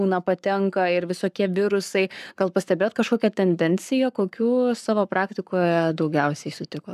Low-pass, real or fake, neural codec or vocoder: 14.4 kHz; fake; codec, 44.1 kHz, 7.8 kbps, Pupu-Codec